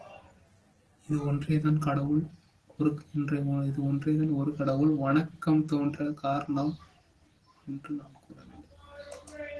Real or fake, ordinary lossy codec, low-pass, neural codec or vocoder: real; Opus, 16 kbps; 10.8 kHz; none